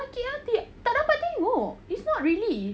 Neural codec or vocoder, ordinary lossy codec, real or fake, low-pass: none; none; real; none